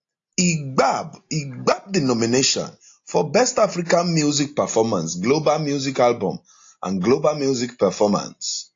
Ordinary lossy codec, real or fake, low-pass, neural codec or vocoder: AAC, 48 kbps; real; 7.2 kHz; none